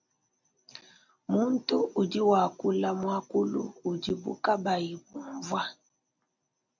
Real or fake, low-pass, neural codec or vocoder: real; 7.2 kHz; none